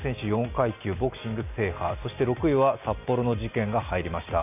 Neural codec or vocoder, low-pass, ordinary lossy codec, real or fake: none; 3.6 kHz; none; real